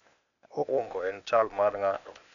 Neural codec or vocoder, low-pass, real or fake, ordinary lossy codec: codec, 16 kHz, 0.8 kbps, ZipCodec; 7.2 kHz; fake; none